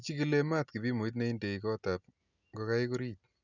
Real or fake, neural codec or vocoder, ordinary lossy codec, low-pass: real; none; none; 7.2 kHz